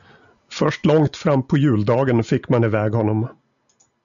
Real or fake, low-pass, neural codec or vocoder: real; 7.2 kHz; none